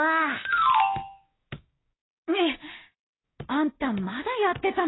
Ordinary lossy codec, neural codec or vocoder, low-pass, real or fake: AAC, 16 kbps; codec, 16 kHz, 6 kbps, DAC; 7.2 kHz; fake